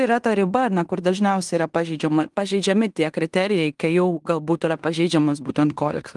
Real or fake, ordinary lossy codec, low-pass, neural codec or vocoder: fake; Opus, 24 kbps; 10.8 kHz; codec, 16 kHz in and 24 kHz out, 0.9 kbps, LongCat-Audio-Codec, fine tuned four codebook decoder